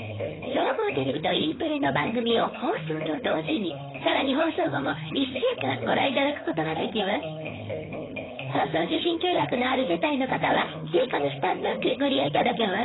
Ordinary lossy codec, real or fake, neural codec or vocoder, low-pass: AAC, 16 kbps; fake; codec, 16 kHz, 4.8 kbps, FACodec; 7.2 kHz